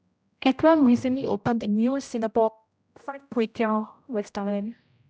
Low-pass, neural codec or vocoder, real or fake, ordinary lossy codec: none; codec, 16 kHz, 0.5 kbps, X-Codec, HuBERT features, trained on general audio; fake; none